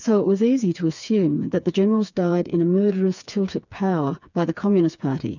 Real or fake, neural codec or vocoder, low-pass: fake; codec, 16 kHz, 4 kbps, FreqCodec, smaller model; 7.2 kHz